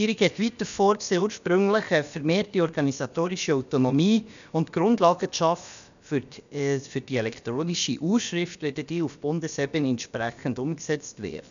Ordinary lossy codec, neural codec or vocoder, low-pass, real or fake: none; codec, 16 kHz, about 1 kbps, DyCAST, with the encoder's durations; 7.2 kHz; fake